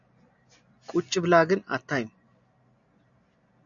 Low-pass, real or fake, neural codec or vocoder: 7.2 kHz; real; none